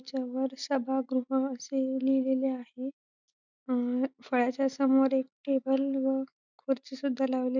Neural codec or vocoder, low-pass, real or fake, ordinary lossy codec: none; 7.2 kHz; real; none